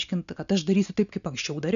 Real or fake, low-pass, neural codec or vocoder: real; 7.2 kHz; none